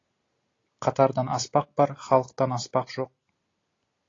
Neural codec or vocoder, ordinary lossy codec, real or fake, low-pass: none; AAC, 32 kbps; real; 7.2 kHz